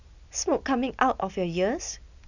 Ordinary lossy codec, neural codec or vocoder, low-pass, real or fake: none; none; 7.2 kHz; real